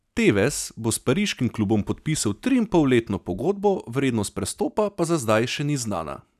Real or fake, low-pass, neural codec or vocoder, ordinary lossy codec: real; 14.4 kHz; none; none